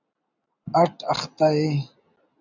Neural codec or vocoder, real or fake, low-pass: none; real; 7.2 kHz